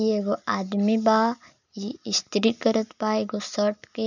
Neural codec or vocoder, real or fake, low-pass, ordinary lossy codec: none; real; 7.2 kHz; none